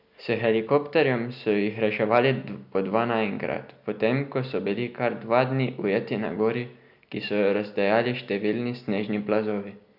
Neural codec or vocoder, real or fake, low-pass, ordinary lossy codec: none; real; 5.4 kHz; none